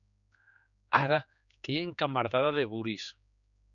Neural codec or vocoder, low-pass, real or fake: codec, 16 kHz, 4 kbps, X-Codec, HuBERT features, trained on general audio; 7.2 kHz; fake